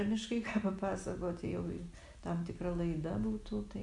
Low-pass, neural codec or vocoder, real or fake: 10.8 kHz; none; real